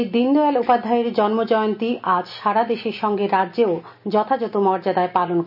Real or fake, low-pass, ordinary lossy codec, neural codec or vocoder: real; 5.4 kHz; none; none